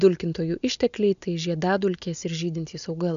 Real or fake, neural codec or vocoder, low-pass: real; none; 7.2 kHz